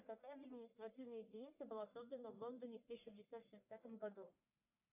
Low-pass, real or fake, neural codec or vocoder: 3.6 kHz; fake; codec, 44.1 kHz, 1.7 kbps, Pupu-Codec